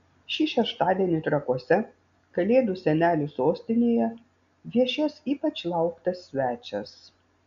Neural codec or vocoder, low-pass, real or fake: none; 7.2 kHz; real